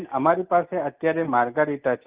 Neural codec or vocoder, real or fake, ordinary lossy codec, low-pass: none; real; Opus, 24 kbps; 3.6 kHz